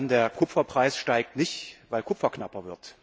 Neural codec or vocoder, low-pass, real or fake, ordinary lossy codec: none; none; real; none